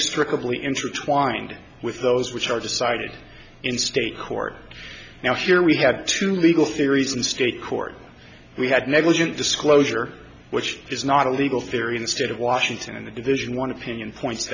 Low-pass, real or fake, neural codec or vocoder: 7.2 kHz; real; none